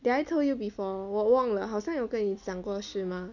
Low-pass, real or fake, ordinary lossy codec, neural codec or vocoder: 7.2 kHz; real; none; none